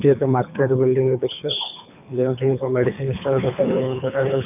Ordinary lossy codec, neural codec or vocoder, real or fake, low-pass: none; codec, 24 kHz, 3 kbps, HILCodec; fake; 3.6 kHz